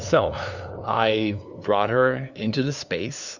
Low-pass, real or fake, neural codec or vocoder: 7.2 kHz; fake; codec, 16 kHz, 2 kbps, FunCodec, trained on LibriTTS, 25 frames a second